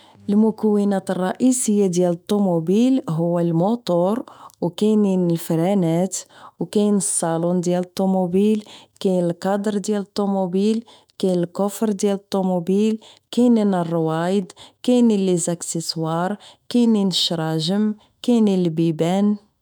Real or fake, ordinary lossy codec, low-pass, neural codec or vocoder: fake; none; none; autoencoder, 48 kHz, 128 numbers a frame, DAC-VAE, trained on Japanese speech